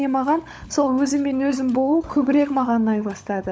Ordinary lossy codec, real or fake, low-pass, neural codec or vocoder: none; fake; none; codec, 16 kHz, 16 kbps, FunCodec, trained on LibriTTS, 50 frames a second